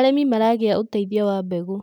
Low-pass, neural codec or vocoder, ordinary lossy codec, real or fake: 19.8 kHz; none; none; real